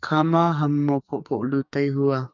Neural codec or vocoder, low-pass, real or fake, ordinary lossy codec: codec, 44.1 kHz, 2.6 kbps, SNAC; 7.2 kHz; fake; none